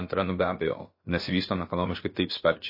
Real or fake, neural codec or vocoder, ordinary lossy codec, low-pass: fake; codec, 16 kHz, about 1 kbps, DyCAST, with the encoder's durations; MP3, 24 kbps; 5.4 kHz